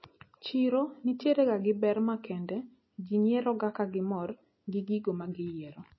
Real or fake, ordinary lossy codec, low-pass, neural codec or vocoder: real; MP3, 24 kbps; 7.2 kHz; none